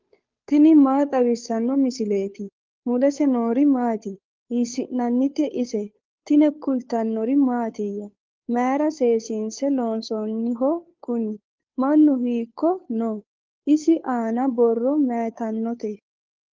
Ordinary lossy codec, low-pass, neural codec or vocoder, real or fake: Opus, 32 kbps; 7.2 kHz; codec, 16 kHz, 2 kbps, FunCodec, trained on Chinese and English, 25 frames a second; fake